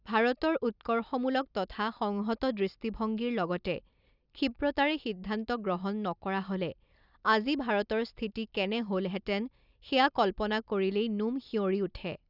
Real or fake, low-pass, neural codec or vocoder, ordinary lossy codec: real; 5.4 kHz; none; none